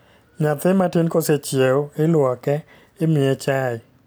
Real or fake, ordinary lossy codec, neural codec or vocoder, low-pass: real; none; none; none